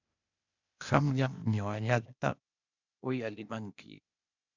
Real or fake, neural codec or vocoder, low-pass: fake; codec, 16 kHz, 0.8 kbps, ZipCodec; 7.2 kHz